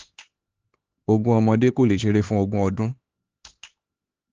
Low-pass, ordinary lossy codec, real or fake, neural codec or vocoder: 7.2 kHz; Opus, 16 kbps; fake; codec, 16 kHz, 4 kbps, X-Codec, HuBERT features, trained on LibriSpeech